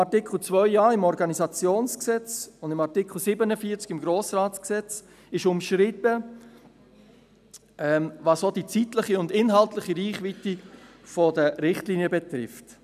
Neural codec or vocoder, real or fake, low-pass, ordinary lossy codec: none; real; 14.4 kHz; none